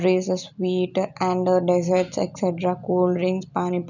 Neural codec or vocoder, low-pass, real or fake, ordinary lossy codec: none; 7.2 kHz; real; none